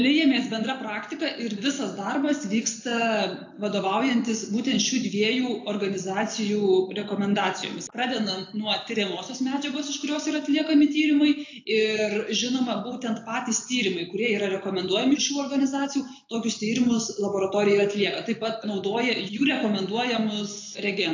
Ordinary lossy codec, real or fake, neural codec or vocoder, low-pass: AAC, 48 kbps; real; none; 7.2 kHz